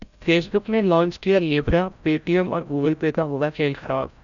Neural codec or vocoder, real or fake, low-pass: codec, 16 kHz, 0.5 kbps, FreqCodec, larger model; fake; 7.2 kHz